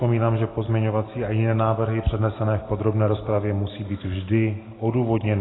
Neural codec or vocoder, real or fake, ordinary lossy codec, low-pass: none; real; AAC, 16 kbps; 7.2 kHz